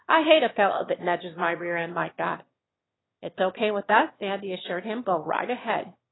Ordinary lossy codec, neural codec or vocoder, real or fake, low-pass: AAC, 16 kbps; autoencoder, 22.05 kHz, a latent of 192 numbers a frame, VITS, trained on one speaker; fake; 7.2 kHz